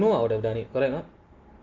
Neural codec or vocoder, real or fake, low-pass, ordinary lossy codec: none; real; 7.2 kHz; Opus, 24 kbps